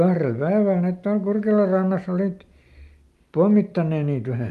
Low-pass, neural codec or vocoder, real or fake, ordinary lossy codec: 14.4 kHz; none; real; Opus, 32 kbps